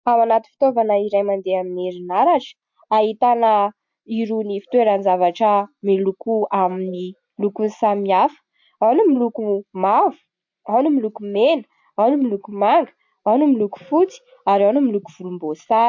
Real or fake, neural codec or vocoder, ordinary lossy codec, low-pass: real; none; MP3, 48 kbps; 7.2 kHz